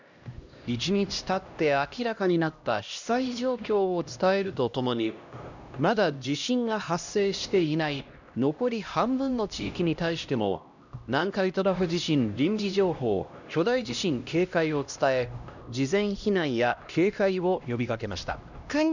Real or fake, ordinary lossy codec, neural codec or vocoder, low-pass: fake; none; codec, 16 kHz, 1 kbps, X-Codec, HuBERT features, trained on LibriSpeech; 7.2 kHz